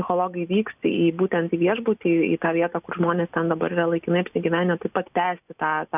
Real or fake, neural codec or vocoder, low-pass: real; none; 3.6 kHz